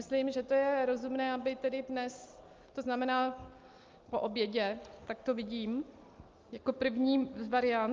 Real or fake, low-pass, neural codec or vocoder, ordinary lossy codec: real; 7.2 kHz; none; Opus, 24 kbps